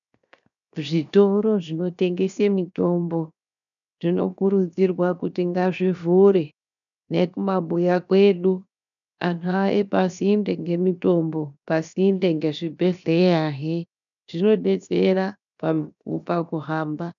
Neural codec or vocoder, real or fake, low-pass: codec, 16 kHz, 0.7 kbps, FocalCodec; fake; 7.2 kHz